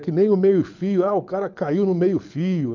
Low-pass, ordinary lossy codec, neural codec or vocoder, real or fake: 7.2 kHz; none; codec, 24 kHz, 6 kbps, HILCodec; fake